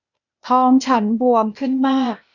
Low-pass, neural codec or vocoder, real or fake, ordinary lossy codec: 7.2 kHz; codec, 16 kHz, 0.8 kbps, ZipCodec; fake; none